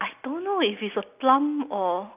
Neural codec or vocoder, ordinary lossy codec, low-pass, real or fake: none; none; 3.6 kHz; real